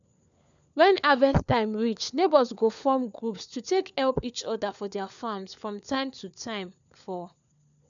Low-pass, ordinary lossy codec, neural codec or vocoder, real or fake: 7.2 kHz; none; codec, 16 kHz, 4 kbps, FunCodec, trained on LibriTTS, 50 frames a second; fake